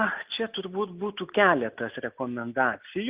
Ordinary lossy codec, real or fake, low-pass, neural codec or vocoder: Opus, 32 kbps; real; 3.6 kHz; none